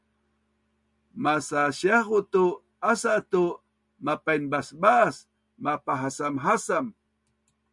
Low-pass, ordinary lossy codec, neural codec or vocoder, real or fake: 10.8 kHz; MP3, 64 kbps; none; real